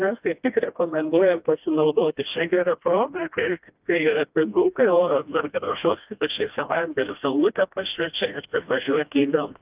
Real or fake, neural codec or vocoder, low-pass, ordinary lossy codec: fake; codec, 16 kHz, 1 kbps, FreqCodec, smaller model; 3.6 kHz; Opus, 24 kbps